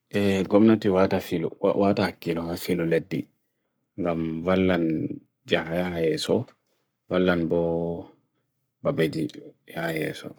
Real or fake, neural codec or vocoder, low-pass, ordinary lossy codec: fake; codec, 44.1 kHz, 7.8 kbps, Pupu-Codec; none; none